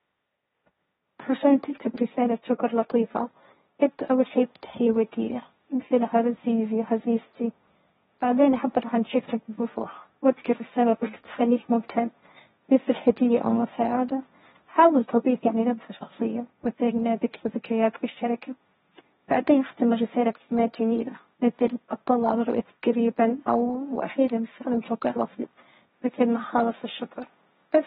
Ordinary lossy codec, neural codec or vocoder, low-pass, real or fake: AAC, 16 kbps; codec, 16 kHz, 1.1 kbps, Voila-Tokenizer; 7.2 kHz; fake